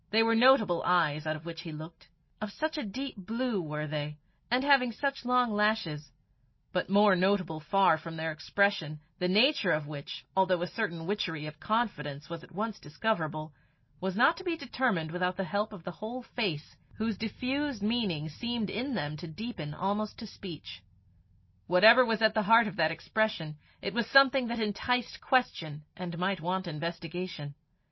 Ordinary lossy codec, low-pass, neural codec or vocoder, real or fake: MP3, 24 kbps; 7.2 kHz; none; real